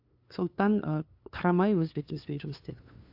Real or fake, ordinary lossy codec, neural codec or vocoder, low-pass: fake; AAC, 48 kbps; codec, 16 kHz, 2 kbps, FunCodec, trained on LibriTTS, 25 frames a second; 5.4 kHz